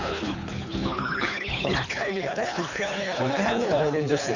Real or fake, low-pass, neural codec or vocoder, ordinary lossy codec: fake; 7.2 kHz; codec, 24 kHz, 3 kbps, HILCodec; none